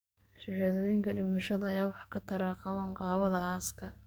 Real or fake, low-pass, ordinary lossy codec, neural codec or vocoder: fake; none; none; codec, 44.1 kHz, 2.6 kbps, SNAC